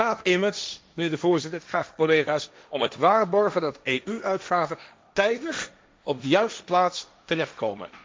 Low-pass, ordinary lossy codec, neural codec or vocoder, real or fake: none; none; codec, 16 kHz, 1.1 kbps, Voila-Tokenizer; fake